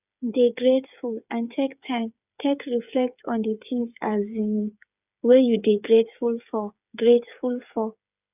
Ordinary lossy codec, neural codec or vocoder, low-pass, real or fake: none; codec, 16 kHz, 8 kbps, FreqCodec, smaller model; 3.6 kHz; fake